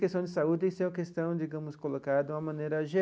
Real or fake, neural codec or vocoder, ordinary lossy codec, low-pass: real; none; none; none